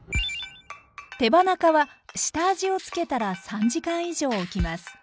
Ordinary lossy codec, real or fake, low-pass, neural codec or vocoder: none; real; none; none